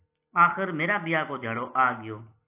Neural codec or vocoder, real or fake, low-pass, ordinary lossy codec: none; real; 3.6 kHz; AAC, 24 kbps